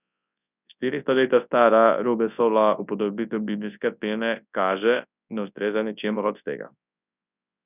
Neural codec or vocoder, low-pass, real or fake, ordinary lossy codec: codec, 24 kHz, 0.9 kbps, WavTokenizer, large speech release; 3.6 kHz; fake; none